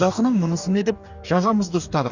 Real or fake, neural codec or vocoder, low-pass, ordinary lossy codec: fake; codec, 44.1 kHz, 2.6 kbps, DAC; 7.2 kHz; none